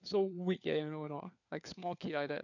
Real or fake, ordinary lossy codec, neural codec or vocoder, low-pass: fake; none; codec, 16 kHz, 4 kbps, FunCodec, trained on LibriTTS, 50 frames a second; 7.2 kHz